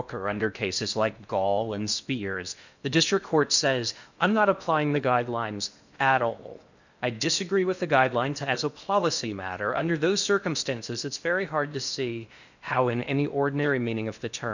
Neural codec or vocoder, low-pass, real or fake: codec, 16 kHz in and 24 kHz out, 0.6 kbps, FocalCodec, streaming, 4096 codes; 7.2 kHz; fake